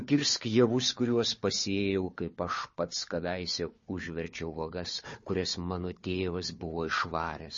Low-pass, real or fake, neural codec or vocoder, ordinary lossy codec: 7.2 kHz; fake; codec, 16 kHz, 4 kbps, FunCodec, trained on Chinese and English, 50 frames a second; MP3, 32 kbps